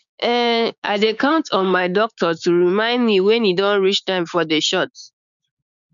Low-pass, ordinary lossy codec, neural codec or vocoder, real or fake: 7.2 kHz; none; codec, 16 kHz, 6 kbps, DAC; fake